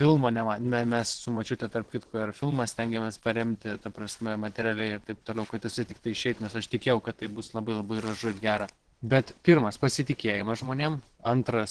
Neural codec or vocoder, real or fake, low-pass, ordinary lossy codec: vocoder, 22.05 kHz, 80 mel bands, WaveNeXt; fake; 9.9 kHz; Opus, 16 kbps